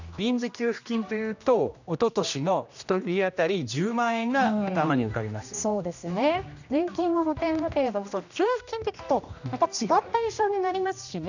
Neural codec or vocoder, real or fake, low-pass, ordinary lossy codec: codec, 16 kHz, 1 kbps, X-Codec, HuBERT features, trained on general audio; fake; 7.2 kHz; none